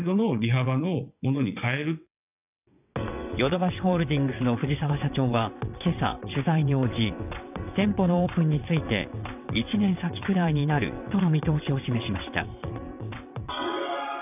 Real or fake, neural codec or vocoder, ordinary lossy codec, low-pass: fake; vocoder, 22.05 kHz, 80 mel bands, WaveNeXt; none; 3.6 kHz